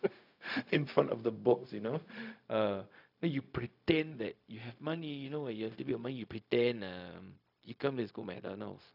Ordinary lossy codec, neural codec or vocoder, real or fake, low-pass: none; codec, 16 kHz, 0.4 kbps, LongCat-Audio-Codec; fake; 5.4 kHz